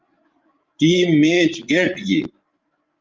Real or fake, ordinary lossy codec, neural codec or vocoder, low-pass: fake; Opus, 24 kbps; codec, 16 kHz, 16 kbps, FreqCodec, larger model; 7.2 kHz